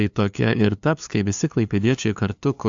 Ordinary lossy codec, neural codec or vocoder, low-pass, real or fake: AAC, 64 kbps; codec, 16 kHz, 2 kbps, FunCodec, trained on LibriTTS, 25 frames a second; 7.2 kHz; fake